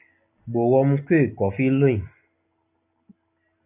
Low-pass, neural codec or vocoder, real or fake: 3.6 kHz; none; real